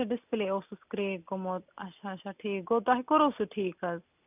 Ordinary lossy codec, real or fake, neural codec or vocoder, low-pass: none; real; none; 3.6 kHz